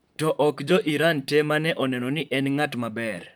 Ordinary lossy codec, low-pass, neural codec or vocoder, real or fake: none; none; vocoder, 44.1 kHz, 128 mel bands, Pupu-Vocoder; fake